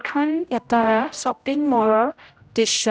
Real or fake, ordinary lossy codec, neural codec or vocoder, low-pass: fake; none; codec, 16 kHz, 0.5 kbps, X-Codec, HuBERT features, trained on general audio; none